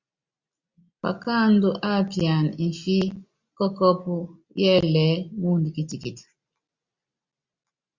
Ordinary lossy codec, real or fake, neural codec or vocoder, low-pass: Opus, 64 kbps; real; none; 7.2 kHz